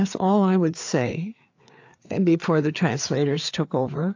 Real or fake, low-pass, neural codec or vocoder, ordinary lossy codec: fake; 7.2 kHz; codec, 16 kHz, 4 kbps, FreqCodec, larger model; AAC, 48 kbps